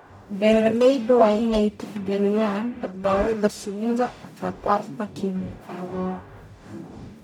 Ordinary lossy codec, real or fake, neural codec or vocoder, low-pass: none; fake; codec, 44.1 kHz, 0.9 kbps, DAC; 19.8 kHz